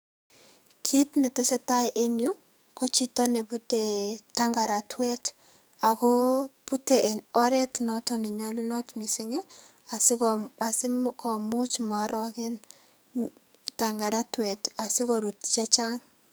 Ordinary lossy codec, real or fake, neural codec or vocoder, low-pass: none; fake; codec, 44.1 kHz, 2.6 kbps, SNAC; none